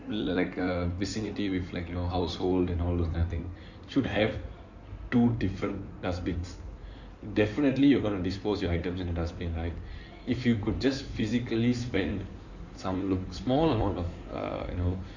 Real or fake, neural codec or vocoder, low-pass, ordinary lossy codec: fake; codec, 16 kHz in and 24 kHz out, 2.2 kbps, FireRedTTS-2 codec; 7.2 kHz; none